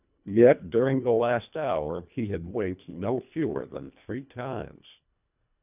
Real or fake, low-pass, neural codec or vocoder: fake; 3.6 kHz; codec, 24 kHz, 1.5 kbps, HILCodec